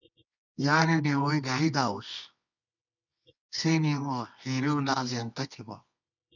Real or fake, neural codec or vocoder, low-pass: fake; codec, 24 kHz, 0.9 kbps, WavTokenizer, medium music audio release; 7.2 kHz